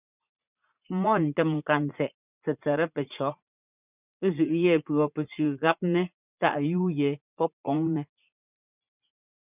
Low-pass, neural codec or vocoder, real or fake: 3.6 kHz; vocoder, 44.1 kHz, 80 mel bands, Vocos; fake